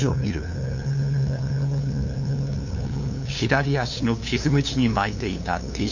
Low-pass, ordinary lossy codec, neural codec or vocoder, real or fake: 7.2 kHz; none; codec, 16 kHz, 2 kbps, FunCodec, trained on LibriTTS, 25 frames a second; fake